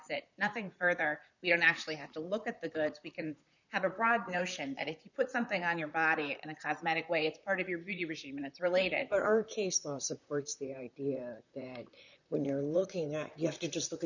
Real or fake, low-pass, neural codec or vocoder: fake; 7.2 kHz; vocoder, 44.1 kHz, 128 mel bands, Pupu-Vocoder